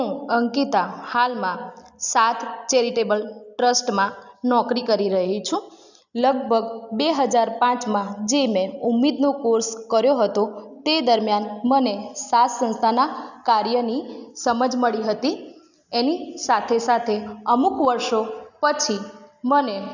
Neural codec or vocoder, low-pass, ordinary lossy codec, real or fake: none; 7.2 kHz; none; real